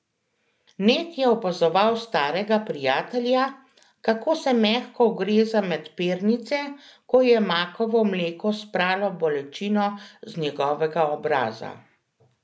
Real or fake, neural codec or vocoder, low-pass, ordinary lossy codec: real; none; none; none